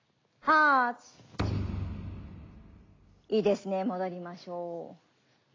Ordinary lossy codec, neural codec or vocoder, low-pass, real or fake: AAC, 32 kbps; none; 7.2 kHz; real